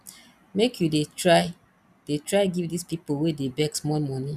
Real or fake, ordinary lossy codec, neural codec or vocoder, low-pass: real; none; none; 14.4 kHz